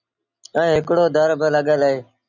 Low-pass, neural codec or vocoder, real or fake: 7.2 kHz; none; real